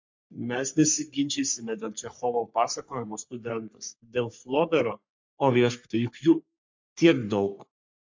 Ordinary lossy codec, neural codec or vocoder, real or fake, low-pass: MP3, 48 kbps; codec, 44.1 kHz, 3.4 kbps, Pupu-Codec; fake; 7.2 kHz